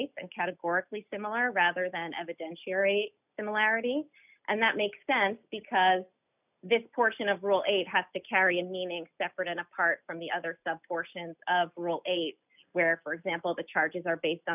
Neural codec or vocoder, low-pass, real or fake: none; 3.6 kHz; real